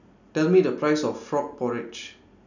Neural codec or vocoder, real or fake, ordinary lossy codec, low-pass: none; real; none; 7.2 kHz